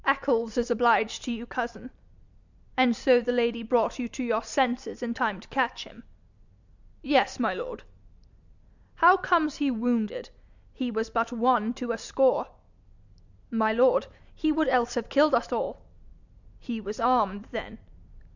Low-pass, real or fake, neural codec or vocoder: 7.2 kHz; real; none